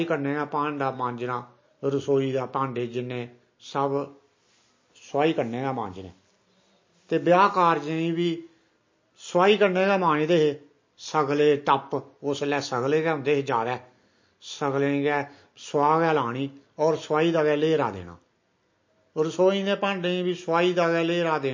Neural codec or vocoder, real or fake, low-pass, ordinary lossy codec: none; real; 7.2 kHz; MP3, 32 kbps